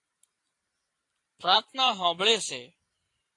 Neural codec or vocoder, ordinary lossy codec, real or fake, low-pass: vocoder, 44.1 kHz, 128 mel bands every 512 samples, BigVGAN v2; AAC, 48 kbps; fake; 10.8 kHz